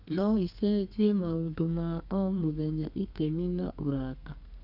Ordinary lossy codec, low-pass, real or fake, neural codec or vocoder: none; 5.4 kHz; fake; codec, 32 kHz, 1.9 kbps, SNAC